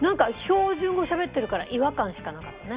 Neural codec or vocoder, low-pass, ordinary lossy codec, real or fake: none; 3.6 kHz; Opus, 64 kbps; real